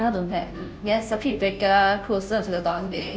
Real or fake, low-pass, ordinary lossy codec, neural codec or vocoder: fake; none; none; codec, 16 kHz, 0.5 kbps, FunCodec, trained on Chinese and English, 25 frames a second